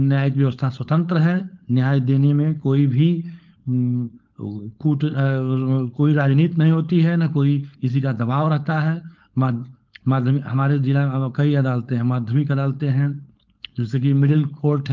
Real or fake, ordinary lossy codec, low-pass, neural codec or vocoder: fake; Opus, 32 kbps; 7.2 kHz; codec, 16 kHz, 4.8 kbps, FACodec